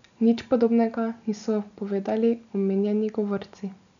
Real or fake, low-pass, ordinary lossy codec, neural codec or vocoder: real; 7.2 kHz; none; none